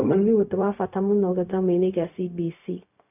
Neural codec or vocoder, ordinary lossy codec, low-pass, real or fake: codec, 16 kHz, 0.4 kbps, LongCat-Audio-Codec; none; 3.6 kHz; fake